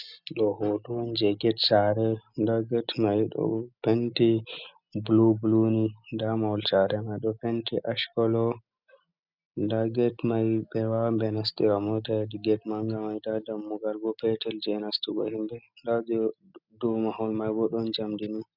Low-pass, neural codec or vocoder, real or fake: 5.4 kHz; none; real